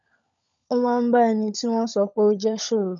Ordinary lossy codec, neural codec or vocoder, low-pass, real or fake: none; codec, 16 kHz, 16 kbps, FunCodec, trained on LibriTTS, 50 frames a second; 7.2 kHz; fake